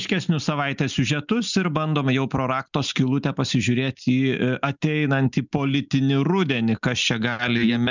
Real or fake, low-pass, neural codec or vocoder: real; 7.2 kHz; none